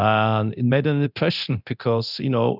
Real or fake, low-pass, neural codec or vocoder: fake; 5.4 kHz; codec, 24 kHz, 0.9 kbps, DualCodec